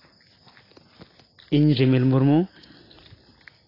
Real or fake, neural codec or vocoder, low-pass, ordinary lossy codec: real; none; 5.4 kHz; AAC, 24 kbps